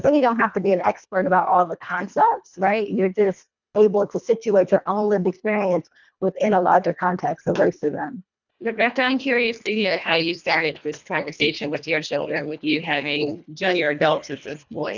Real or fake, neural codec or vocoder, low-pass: fake; codec, 24 kHz, 1.5 kbps, HILCodec; 7.2 kHz